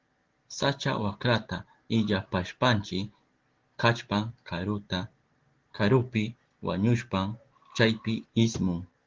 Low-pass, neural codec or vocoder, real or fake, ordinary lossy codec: 7.2 kHz; none; real; Opus, 16 kbps